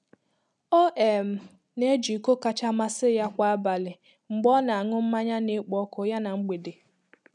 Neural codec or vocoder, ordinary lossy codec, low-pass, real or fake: none; none; 10.8 kHz; real